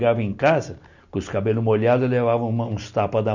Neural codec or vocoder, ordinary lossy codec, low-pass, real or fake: none; none; 7.2 kHz; real